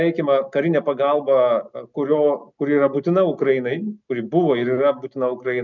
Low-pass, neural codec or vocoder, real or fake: 7.2 kHz; none; real